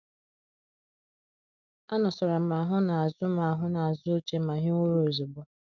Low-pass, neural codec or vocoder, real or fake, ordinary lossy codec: 7.2 kHz; none; real; none